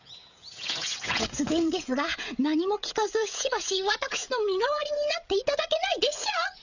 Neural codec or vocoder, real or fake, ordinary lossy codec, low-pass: codec, 16 kHz, 16 kbps, FreqCodec, smaller model; fake; none; 7.2 kHz